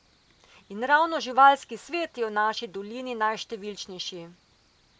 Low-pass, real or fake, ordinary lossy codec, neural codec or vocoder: none; real; none; none